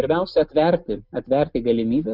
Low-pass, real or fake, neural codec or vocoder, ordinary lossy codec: 5.4 kHz; real; none; Opus, 24 kbps